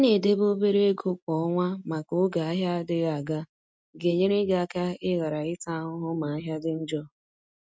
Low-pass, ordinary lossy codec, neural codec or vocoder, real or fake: none; none; none; real